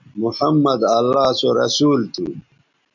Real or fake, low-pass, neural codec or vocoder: real; 7.2 kHz; none